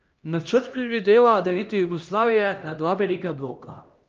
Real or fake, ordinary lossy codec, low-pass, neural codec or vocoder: fake; Opus, 24 kbps; 7.2 kHz; codec, 16 kHz, 1 kbps, X-Codec, HuBERT features, trained on LibriSpeech